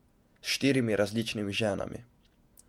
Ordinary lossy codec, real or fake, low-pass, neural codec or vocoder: MP3, 96 kbps; fake; 19.8 kHz; vocoder, 48 kHz, 128 mel bands, Vocos